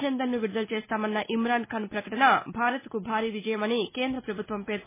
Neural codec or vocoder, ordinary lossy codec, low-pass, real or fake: none; MP3, 16 kbps; 3.6 kHz; real